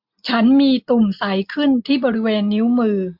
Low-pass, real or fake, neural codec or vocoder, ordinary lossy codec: 5.4 kHz; real; none; MP3, 48 kbps